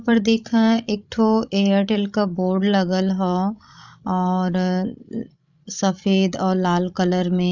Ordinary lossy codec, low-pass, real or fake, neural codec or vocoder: none; 7.2 kHz; fake; codec, 16 kHz, 16 kbps, FreqCodec, larger model